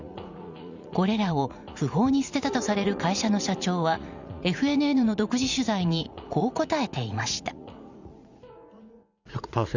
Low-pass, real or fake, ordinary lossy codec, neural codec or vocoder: 7.2 kHz; fake; Opus, 64 kbps; vocoder, 44.1 kHz, 80 mel bands, Vocos